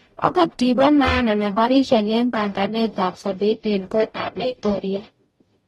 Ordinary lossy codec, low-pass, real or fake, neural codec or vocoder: AAC, 32 kbps; 19.8 kHz; fake; codec, 44.1 kHz, 0.9 kbps, DAC